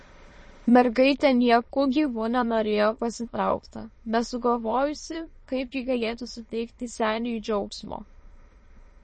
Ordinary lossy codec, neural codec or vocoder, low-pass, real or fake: MP3, 32 kbps; autoencoder, 22.05 kHz, a latent of 192 numbers a frame, VITS, trained on many speakers; 9.9 kHz; fake